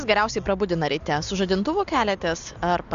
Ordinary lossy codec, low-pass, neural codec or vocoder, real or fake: Opus, 64 kbps; 7.2 kHz; none; real